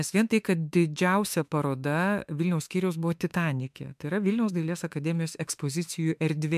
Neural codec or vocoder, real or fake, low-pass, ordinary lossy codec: autoencoder, 48 kHz, 32 numbers a frame, DAC-VAE, trained on Japanese speech; fake; 14.4 kHz; MP3, 96 kbps